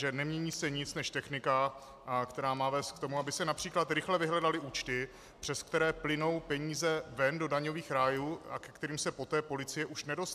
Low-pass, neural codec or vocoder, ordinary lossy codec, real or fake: 14.4 kHz; none; AAC, 96 kbps; real